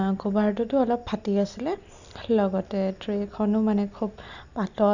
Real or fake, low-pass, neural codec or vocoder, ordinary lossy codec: real; 7.2 kHz; none; none